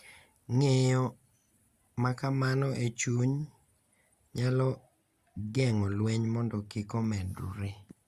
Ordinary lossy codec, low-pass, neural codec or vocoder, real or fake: Opus, 64 kbps; 14.4 kHz; none; real